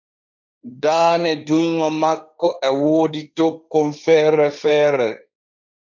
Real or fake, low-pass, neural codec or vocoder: fake; 7.2 kHz; codec, 16 kHz, 1.1 kbps, Voila-Tokenizer